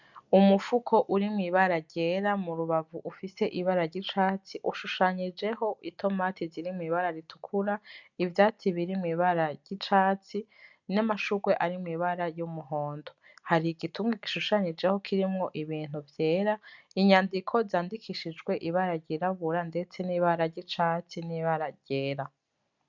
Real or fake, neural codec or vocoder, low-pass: real; none; 7.2 kHz